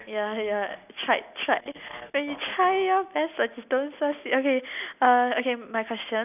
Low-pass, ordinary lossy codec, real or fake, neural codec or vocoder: 3.6 kHz; none; real; none